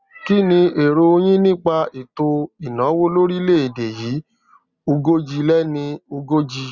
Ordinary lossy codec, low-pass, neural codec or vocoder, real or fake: Opus, 64 kbps; 7.2 kHz; none; real